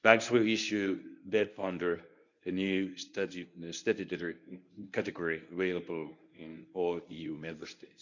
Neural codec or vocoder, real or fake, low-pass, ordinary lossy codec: codec, 24 kHz, 0.9 kbps, WavTokenizer, medium speech release version 1; fake; 7.2 kHz; none